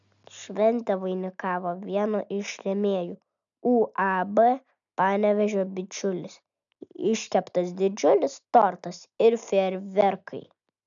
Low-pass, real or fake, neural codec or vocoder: 7.2 kHz; real; none